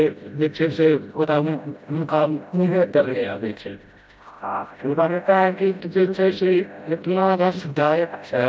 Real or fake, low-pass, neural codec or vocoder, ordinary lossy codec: fake; none; codec, 16 kHz, 0.5 kbps, FreqCodec, smaller model; none